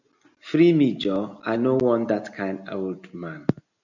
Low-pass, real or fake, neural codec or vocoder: 7.2 kHz; real; none